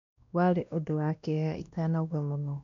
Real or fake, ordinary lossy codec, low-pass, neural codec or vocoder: fake; MP3, 64 kbps; 7.2 kHz; codec, 16 kHz, 1 kbps, X-Codec, WavLM features, trained on Multilingual LibriSpeech